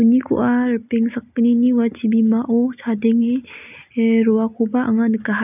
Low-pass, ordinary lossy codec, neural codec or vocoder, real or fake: 3.6 kHz; none; none; real